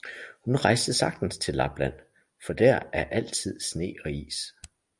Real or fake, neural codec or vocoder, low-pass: real; none; 10.8 kHz